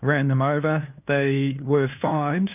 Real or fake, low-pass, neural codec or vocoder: fake; 3.6 kHz; codec, 16 kHz, 2 kbps, FunCodec, trained on LibriTTS, 25 frames a second